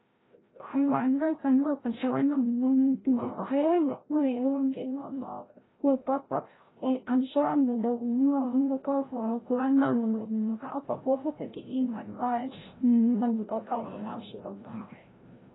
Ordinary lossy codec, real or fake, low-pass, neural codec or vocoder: AAC, 16 kbps; fake; 7.2 kHz; codec, 16 kHz, 0.5 kbps, FreqCodec, larger model